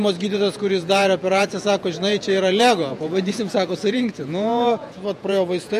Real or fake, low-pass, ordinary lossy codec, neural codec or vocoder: fake; 14.4 kHz; MP3, 64 kbps; vocoder, 48 kHz, 128 mel bands, Vocos